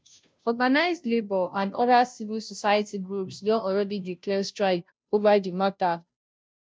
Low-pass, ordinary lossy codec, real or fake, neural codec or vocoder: none; none; fake; codec, 16 kHz, 0.5 kbps, FunCodec, trained on Chinese and English, 25 frames a second